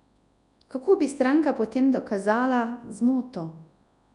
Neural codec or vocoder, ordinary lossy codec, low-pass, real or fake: codec, 24 kHz, 0.9 kbps, WavTokenizer, large speech release; none; 10.8 kHz; fake